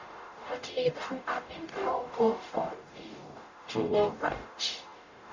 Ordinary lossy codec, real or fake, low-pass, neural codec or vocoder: Opus, 64 kbps; fake; 7.2 kHz; codec, 44.1 kHz, 0.9 kbps, DAC